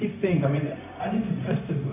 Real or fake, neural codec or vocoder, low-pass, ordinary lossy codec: fake; codec, 16 kHz, 0.4 kbps, LongCat-Audio-Codec; 3.6 kHz; none